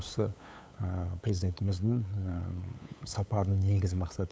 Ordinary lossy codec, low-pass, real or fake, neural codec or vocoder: none; none; fake; codec, 16 kHz, 8 kbps, FunCodec, trained on LibriTTS, 25 frames a second